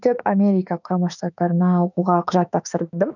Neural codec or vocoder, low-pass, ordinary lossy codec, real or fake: autoencoder, 48 kHz, 32 numbers a frame, DAC-VAE, trained on Japanese speech; 7.2 kHz; none; fake